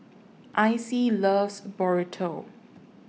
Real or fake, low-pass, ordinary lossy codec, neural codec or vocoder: real; none; none; none